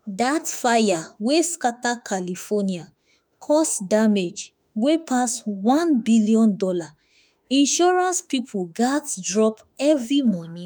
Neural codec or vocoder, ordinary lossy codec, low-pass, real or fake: autoencoder, 48 kHz, 32 numbers a frame, DAC-VAE, trained on Japanese speech; none; none; fake